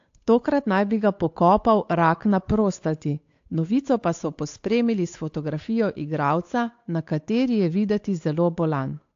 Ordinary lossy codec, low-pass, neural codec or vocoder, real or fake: AAC, 48 kbps; 7.2 kHz; codec, 16 kHz, 8 kbps, FunCodec, trained on Chinese and English, 25 frames a second; fake